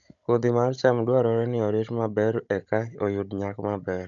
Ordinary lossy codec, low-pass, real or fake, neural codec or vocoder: none; 7.2 kHz; fake; codec, 16 kHz, 16 kbps, FunCodec, trained on Chinese and English, 50 frames a second